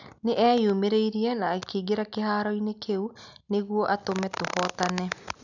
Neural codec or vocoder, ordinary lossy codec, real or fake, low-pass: none; none; real; 7.2 kHz